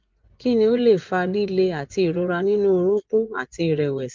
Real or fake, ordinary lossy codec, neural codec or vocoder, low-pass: fake; Opus, 32 kbps; vocoder, 24 kHz, 100 mel bands, Vocos; 7.2 kHz